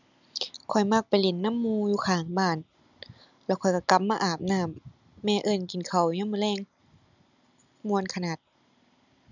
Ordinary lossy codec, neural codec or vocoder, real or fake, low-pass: none; none; real; 7.2 kHz